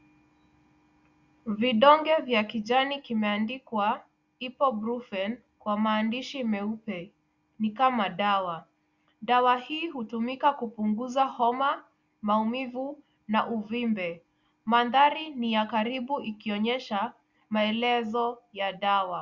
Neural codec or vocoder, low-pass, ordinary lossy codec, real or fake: none; 7.2 kHz; Opus, 64 kbps; real